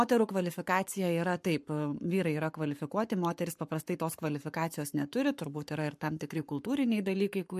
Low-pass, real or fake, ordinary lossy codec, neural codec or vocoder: 14.4 kHz; fake; MP3, 64 kbps; codec, 44.1 kHz, 7.8 kbps, Pupu-Codec